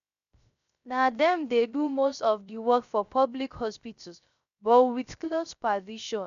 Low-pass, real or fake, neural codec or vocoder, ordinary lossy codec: 7.2 kHz; fake; codec, 16 kHz, 0.3 kbps, FocalCodec; none